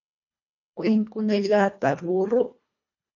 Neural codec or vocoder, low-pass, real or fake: codec, 24 kHz, 1.5 kbps, HILCodec; 7.2 kHz; fake